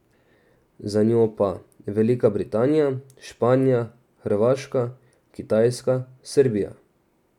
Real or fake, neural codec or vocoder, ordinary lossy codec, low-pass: real; none; none; 19.8 kHz